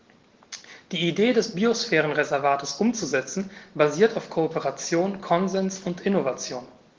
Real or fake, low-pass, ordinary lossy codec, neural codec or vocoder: real; 7.2 kHz; Opus, 16 kbps; none